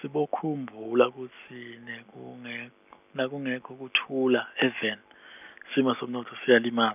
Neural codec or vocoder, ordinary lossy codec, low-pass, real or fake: none; none; 3.6 kHz; real